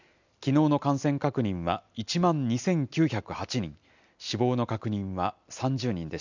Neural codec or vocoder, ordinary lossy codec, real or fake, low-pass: none; none; real; 7.2 kHz